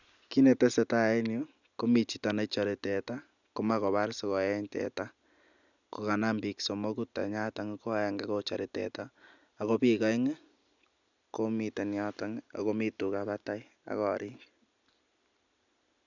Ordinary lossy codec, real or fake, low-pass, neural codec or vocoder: none; real; 7.2 kHz; none